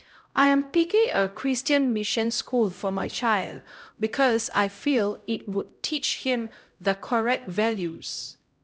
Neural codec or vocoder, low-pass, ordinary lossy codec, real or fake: codec, 16 kHz, 0.5 kbps, X-Codec, HuBERT features, trained on LibriSpeech; none; none; fake